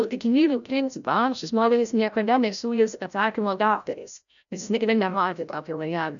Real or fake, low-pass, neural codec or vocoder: fake; 7.2 kHz; codec, 16 kHz, 0.5 kbps, FreqCodec, larger model